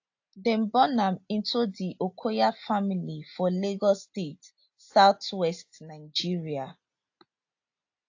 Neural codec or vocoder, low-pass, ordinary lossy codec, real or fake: none; 7.2 kHz; AAC, 48 kbps; real